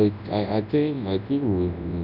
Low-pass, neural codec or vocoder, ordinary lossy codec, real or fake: 5.4 kHz; codec, 24 kHz, 0.9 kbps, WavTokenizer, large speech release; none; fake